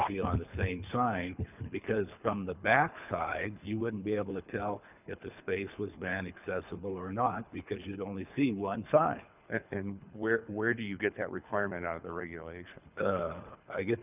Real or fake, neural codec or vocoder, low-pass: fake; codec, 24 kHz, 6 kbps, HILCodec; 3.6 kHz